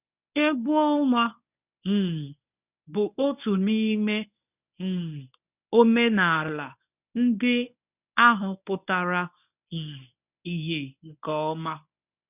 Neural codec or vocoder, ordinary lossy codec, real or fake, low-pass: codec, 24 kHz, 0.9 kbps, WavTokenizer, medium speech release version 1; none; fake; 3.6 kHz